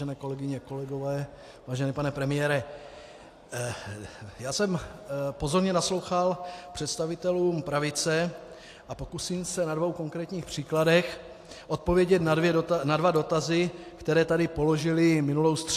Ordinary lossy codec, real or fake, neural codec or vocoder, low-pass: AAC, 64 kbps; real; none; 14.4 kHz